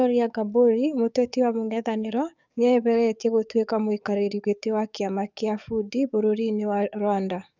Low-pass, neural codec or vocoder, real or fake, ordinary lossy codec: 7.2 kHz; codec, 44.1 kHz, 7.8 kbps, DAC; fake; none